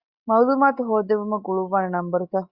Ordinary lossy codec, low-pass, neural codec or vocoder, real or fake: Opus, 64 kbps; 5.4 kHz; none; real